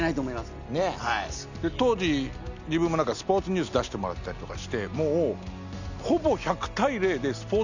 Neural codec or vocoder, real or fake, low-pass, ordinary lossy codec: none; real; 7.2 kHz; none